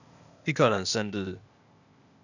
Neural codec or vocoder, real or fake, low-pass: codec, 16 kHz, 0.8 kbps, ZipCodec; fake; 7.2 kHz